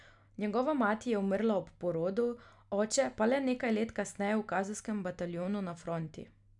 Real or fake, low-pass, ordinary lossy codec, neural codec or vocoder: real; 10.8 kHz; none; none